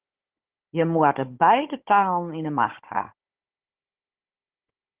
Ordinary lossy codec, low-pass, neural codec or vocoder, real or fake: Opus, 16 kbps; 3.6 kHz; codec, 16 kHz, 16 kbps, FunCodec, trained on Chinese and English, 50 frames a second; fake